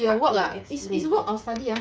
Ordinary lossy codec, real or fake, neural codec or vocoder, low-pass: none; fake; codec, 16 kHz, 8 kbps, FreqCodec, smaller model; none